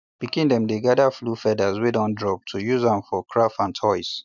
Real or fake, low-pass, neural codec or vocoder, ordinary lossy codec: real; 7.2 kHz; none; none